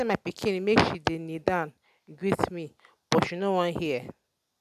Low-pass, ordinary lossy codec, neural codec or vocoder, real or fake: 14.4 kHz; none; none; real